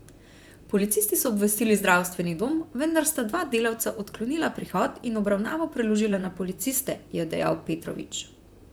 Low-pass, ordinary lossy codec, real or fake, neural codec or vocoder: none; none; fake; vocoder, 44.1 kHz, 128 mel bands, Pupu-Vocoder